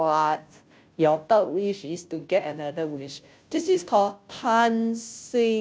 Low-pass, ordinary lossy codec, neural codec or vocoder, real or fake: none; none; codec, 16 kHz, 0.5 kbps, FunCodec, trained on Chinese and English, 25 frames a second; fake